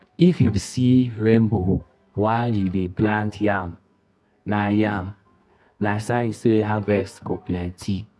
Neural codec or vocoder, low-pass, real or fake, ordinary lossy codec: codec, 24 kHz, 0.9 kbps, WavTokenizer, medium music audio release; none; fake; none